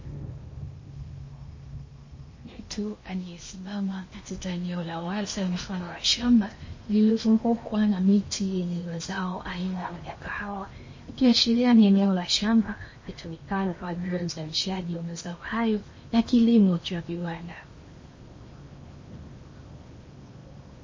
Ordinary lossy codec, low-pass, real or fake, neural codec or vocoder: MP3, 32 kbps; 7.2 kHz; fake; codec, 16 kHz in and 24 kHz out, 0.8 kbps, FocalCodec, streaming, 65536 codes